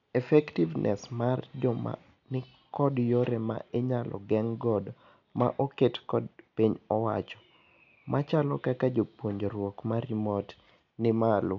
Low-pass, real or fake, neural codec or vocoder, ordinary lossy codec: 7.2 kHz; real; none; none